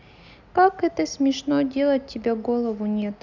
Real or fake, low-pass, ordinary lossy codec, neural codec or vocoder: real; 7.2 kHz; none; none